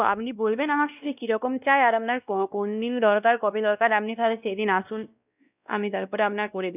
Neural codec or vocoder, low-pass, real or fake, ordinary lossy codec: codec, 16 kHz, 1 kbps, X-Codec, WavLM features, trained on Multilingual LibriSpeech; 3.6 kHz; fake; none